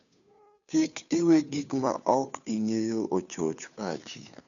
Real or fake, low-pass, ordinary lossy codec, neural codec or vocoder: fake; 7.2 kHz; none; codec, 16 kHz, 2 kbps, FunCodec, trained on Chinese and English, 25 frames a second